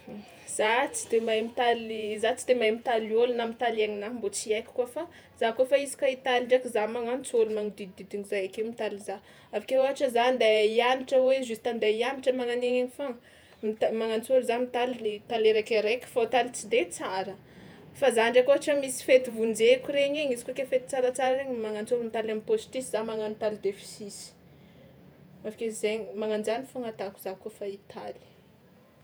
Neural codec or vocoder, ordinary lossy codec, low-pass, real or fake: vocoder, 48 kHz, 128 mel bands, Vocos; none; none; fake